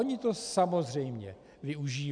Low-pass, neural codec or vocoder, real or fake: 9.9 kHz; none; real